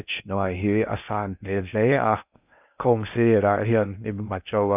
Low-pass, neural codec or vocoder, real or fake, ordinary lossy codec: 3.6 kHz; codec, 16 kHz in and 24 kHz out, 0.6 kbps, FocalCodec, streaming, 4096 codes; fake; none